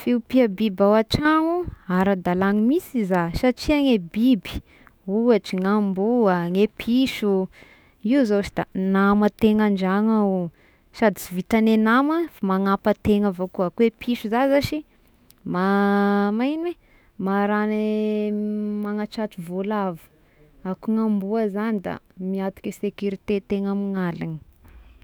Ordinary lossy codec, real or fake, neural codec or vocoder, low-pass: none; fake; autoencoder, 48 kHz, 128 numbers a frame, DAC-VAE, trained on Japanese speech; none